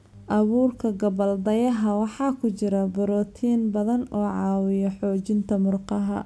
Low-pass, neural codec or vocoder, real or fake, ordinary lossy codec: none; none; real; none